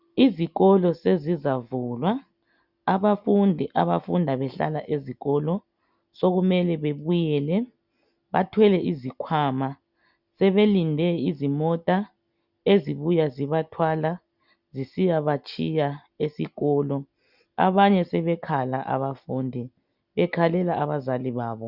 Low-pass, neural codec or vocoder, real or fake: 5.4 kHz; none; real